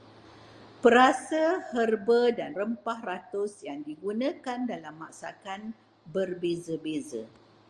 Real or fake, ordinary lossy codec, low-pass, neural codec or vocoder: real; Opus, 32 kbps; 9.9 kHz; none